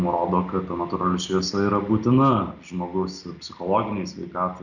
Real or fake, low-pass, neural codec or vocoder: real; 7.2 kHz; none